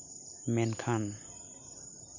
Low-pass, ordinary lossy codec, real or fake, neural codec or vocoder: 7.2 kHz; none; real; none